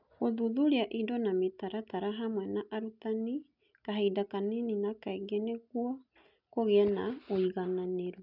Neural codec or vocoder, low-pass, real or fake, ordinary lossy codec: none; 5.4 kHz; real; none